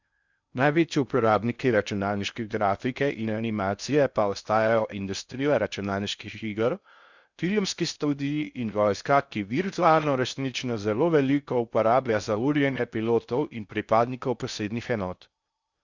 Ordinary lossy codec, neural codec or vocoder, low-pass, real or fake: Opus, 64 kbps; codec, 16 kHz in and 24 kHz out, 0.6 kbps, FocalCodec, streaming, 2048 codes; 7.2 kHz; fake